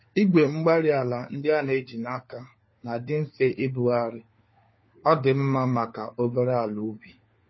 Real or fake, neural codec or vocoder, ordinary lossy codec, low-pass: fake; codec, 16 kHz, 4 kbps, FunCodec, trained on LibriTTS, 50 frames a second; MP3, 24 kbps; 7.2 kHz